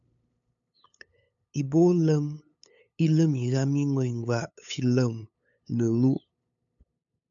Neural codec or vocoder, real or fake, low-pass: codec, 16 kHz, 8 kbps, FunCodec, trained on LibriTTS, 25 frames a second; fake; 7.2 kHz